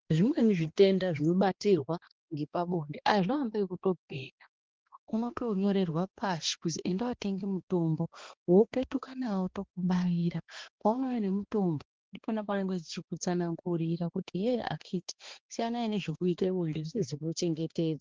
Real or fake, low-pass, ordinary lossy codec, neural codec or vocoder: fake; 7.2 kHz; Opus, 16 kbps; codec, 16 kHz, 2 kbps, X-Codec, HuBERT features, trained on balanced general audio